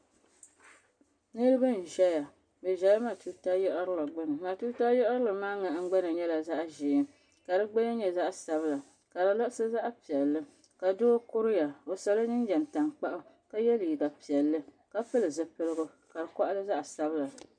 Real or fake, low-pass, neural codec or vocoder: real; 9.9 kHz; none